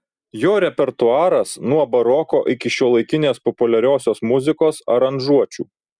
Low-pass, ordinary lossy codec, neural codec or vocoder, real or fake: 9.9 kHz; Opus, 64 kbps; none; real